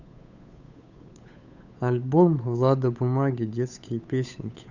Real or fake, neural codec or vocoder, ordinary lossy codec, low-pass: fake; codec, 16 kHz, 8 kbps, FunCodec, trained on Chinese and English, 25 frames a second; none; 7.2 kHz